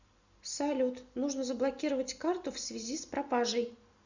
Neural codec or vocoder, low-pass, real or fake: none; 7.2 kHz; real